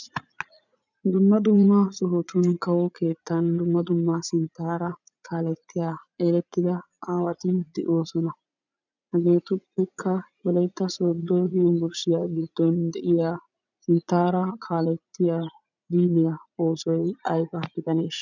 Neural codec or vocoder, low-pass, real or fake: vocoder, 44.1 kHz, 80 mel bands, Vocos; 7.2 kHz; fake